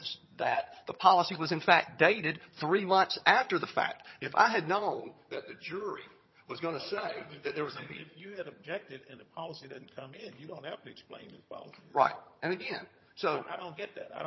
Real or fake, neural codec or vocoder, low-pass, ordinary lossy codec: fake; vocoder, 22.05 kHz, 80 mel bands, HiFi-GAN; 7.2 kHz; MP3, 24 kbps